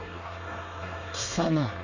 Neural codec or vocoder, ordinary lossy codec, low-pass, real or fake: codec, 24 kHz, 1 kbps, SNAC; none; 7.2 kHz; fake